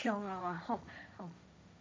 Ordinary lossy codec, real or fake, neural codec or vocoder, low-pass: none; fake; codec, 16 kHz, 1.1 kbps, Voila-Tokenizer; none